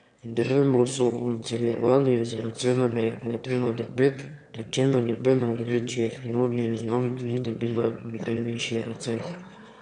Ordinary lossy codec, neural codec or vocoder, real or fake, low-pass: none; autoencoder, 22.05 kHz, a latent of 192 numbers a frame, VITS, trained on one speaker; fake; 9.9 kHz